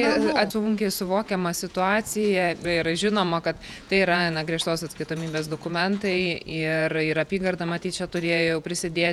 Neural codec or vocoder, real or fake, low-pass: vocoder, 48 kHz, 128 mel bands, Vocos; fake; 19.8 kHz